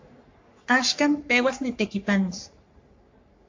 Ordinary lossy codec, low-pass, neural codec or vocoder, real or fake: MP3, 48 kbps; 7.2 kHz; codec, 44.1 kHz, 3.4 kbps, Pupu-Codec; fake